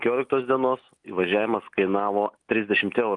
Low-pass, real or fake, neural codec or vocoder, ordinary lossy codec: 10.8 kHz; real; none; Opus, 32 kbps